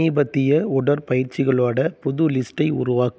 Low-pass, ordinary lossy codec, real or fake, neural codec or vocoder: none; none; real; none